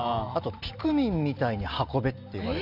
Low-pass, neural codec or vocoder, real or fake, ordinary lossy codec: 5.4 kHz; none; real; none